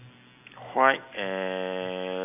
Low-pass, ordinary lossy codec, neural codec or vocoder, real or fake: 3.6 kHz; none; none; real